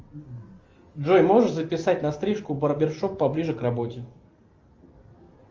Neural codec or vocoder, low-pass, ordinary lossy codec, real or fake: none; 7.2 kHz; Opus, 32 kbps; real